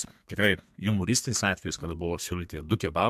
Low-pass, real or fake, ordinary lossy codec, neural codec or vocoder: 14.4 kHz; fake; MP3, 96 kbps; codec, 32 kHz, 1.9 kbps, SNAC